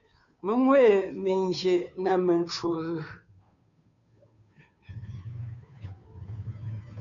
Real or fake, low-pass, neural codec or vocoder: fake; 7.2 kHz; codec, 16 kHz, 2 kbps, FunCodec, trained on Chinese and English, 25 frames a second